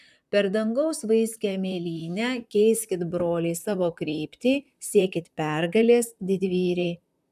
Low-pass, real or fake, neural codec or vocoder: 14.4 kHz; fake; vocoder, 44.1 kHz, 128 mel bands, Pupu-Vocoder